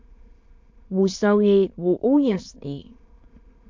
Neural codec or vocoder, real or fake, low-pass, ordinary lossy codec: autoencoder, 22.05 kHz, a latent of 192 numbers a frame, VITS, trained on many speakers; fake; 7.2 kHz; MP3, 48 kbps